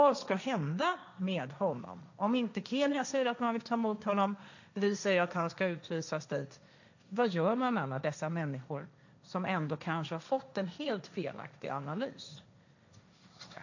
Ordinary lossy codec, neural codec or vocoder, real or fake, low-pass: none; codec, 16 kHz, 1.1 kbps, Voila-Tokenizer; fake; 7.2 kHz